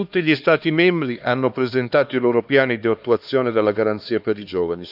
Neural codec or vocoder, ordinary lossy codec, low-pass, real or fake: codec, 16 kHz, 2 kbps, X-Codec, HuBERT features, trained on LibriSpeech; none; 5.4 kHz; fake